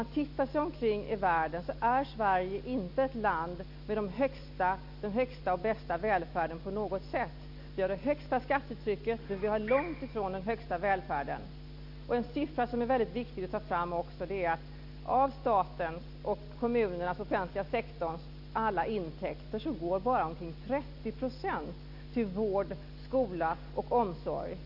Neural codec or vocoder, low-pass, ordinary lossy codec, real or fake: none; 5.4 kHz; AAC, 48 kbps; real